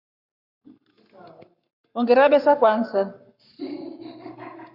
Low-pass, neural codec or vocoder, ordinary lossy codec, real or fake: 5.4 kHz; codec, 44.1 kHz, 7.8 kbps, Pupu-Codec; Opus, 64 kbps; fake